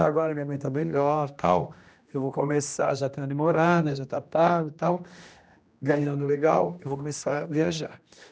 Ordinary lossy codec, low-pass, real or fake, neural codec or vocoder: none; none; fake; codec, 16 kHz, 1 kbps, X-Codec, HuBERT features, trained on general audio